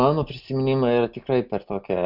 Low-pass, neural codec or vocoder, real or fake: 5.4 kHz; none; real